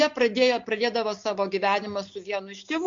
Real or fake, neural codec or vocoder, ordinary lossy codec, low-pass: real; none; MP3, 48 kbps; 7.2 kHz